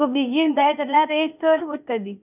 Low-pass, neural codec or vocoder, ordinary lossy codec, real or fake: 3.6 kHz; codec, 16 kHz, 0.8 kbps, ZipCodec; none; fake